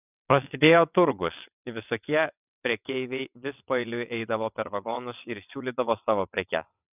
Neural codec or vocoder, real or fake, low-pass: vocoder, 22.05 kHz, 80 mel bands, WaveNeXt; fake; 3.6 kHz